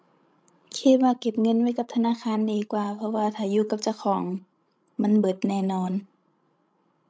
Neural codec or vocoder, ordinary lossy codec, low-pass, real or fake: codec, 16 kHz, 16 kbps, FreqCodec, larger model; none; none; fake